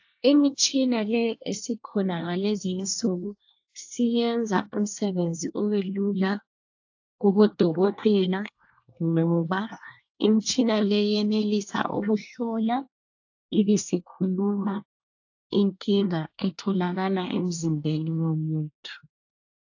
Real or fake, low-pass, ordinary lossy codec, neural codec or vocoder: fake; 7.2 kHz; AAC, 48 kbps; codec, 24 kHz, 1 kbps, SNAC